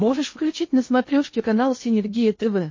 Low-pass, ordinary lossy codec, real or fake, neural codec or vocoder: 7.2 kHz; MP3, 32 kbps; fake; codec, 16 kHz in and 24 kHz out, 0.8 kbps, FocalCodec, streaming, 65536 codes